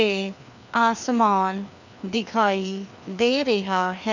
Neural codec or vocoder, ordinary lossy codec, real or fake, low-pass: codec, 16 kHz, 2 kbps, FreqCodec, larger model; none; fake; 7.2 kHz